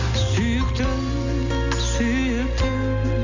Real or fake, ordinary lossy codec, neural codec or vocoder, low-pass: real; none; none; 7.2 kHz